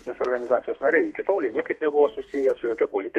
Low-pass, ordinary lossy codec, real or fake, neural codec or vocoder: 14.4 kHz; MP3, 64 kbps; fake; codec, 32 kHz, 1.9 kbps, SNAC